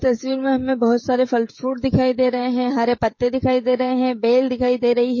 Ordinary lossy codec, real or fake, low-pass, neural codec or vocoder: MP3, 32 kbps; fake; 7.2 kHz; codec, 16 kHz, 16 kbps, FreqCodec, smaller model